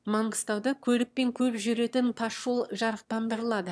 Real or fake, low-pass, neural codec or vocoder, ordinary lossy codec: fake; none; autoencoder, 22.05 kHz, a latent of 192 numbers a frame, VITS, trained on one speaker; none